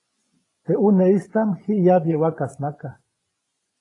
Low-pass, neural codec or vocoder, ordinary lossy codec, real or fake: 10.8 kHz; vocoder, 24 kHz, 100 mel bands, Vocos; AAC, 32 kbps; fake